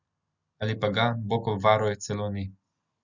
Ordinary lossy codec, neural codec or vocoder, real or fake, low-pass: Opus, 64 kbps; none; real; 7.2 kHz